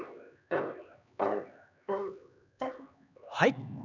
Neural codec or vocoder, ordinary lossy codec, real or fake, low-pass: codec, 16 kHz, 2 kbps, X-Codec, HuBERT features, trained on LibriSpeech; none; fake; 7.2 kHz